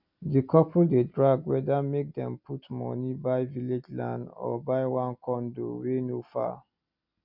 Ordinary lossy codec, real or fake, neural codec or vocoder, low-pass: none; real; none; 5.4 kHz